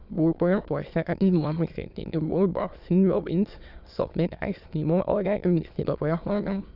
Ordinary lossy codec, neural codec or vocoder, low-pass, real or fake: none; autoencoder, 22.05 kHz, a latent of 192 numbers a frame, VITS, trained on many speakers; 5.4 kHz; fake